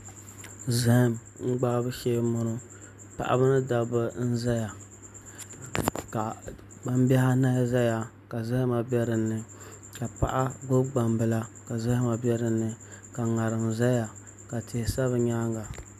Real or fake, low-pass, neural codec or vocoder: real; 14.4 kHz; none